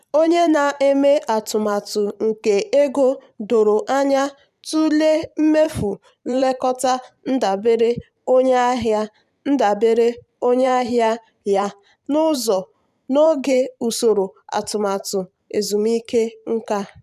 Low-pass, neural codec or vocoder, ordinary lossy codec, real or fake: 14.4 kHz; vocoder, 44.1 kHz, 128 mel bands every 512 samples, BigVGAN v2; none; fake